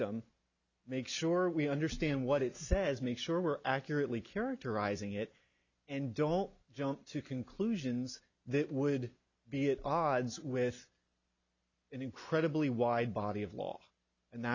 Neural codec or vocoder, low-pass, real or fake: none; 7.2 kHz; real